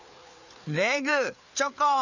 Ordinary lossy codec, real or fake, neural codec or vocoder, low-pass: none; fake; codec, 16 kHz, 4 kbps, FreqCodec, larger model; 7.2 kHz